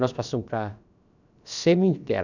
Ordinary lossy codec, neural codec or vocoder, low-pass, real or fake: none; codec, 16 kHz, about 1 kbps, DyCAST, with the encoder's durations; 7.2 kHz; fake